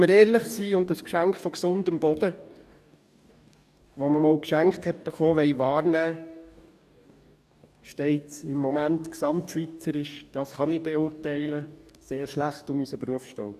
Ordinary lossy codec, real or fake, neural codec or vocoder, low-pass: none; fake; codec, 44.1 kHz, 2.6 kbps, DAC; 14.4 kHz